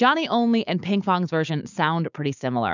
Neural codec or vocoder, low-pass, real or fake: codec, 16 kHz, 6 kbps, DAC; 7.2 kHz; fake